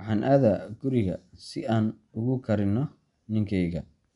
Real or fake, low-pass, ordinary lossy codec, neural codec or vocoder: real; 10.8 kHz; none; none